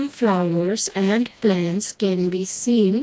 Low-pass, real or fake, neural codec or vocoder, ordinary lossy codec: none; fake; codec, 16 kHz, 1 kbps, FreqCodec, smaller model; none